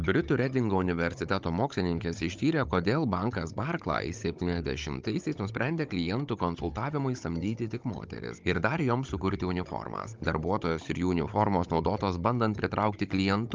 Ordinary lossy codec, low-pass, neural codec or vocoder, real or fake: Opus, 32 kbps; 7.2 kHz; codec, 16 kHz, 16 kbps, FunCodec, trained on Chinese and English, 50 frames a second; fake